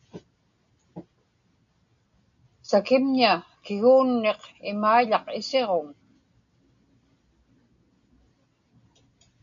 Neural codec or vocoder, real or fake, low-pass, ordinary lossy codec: none; real; 7.2 kHz; MP3, 64 kbps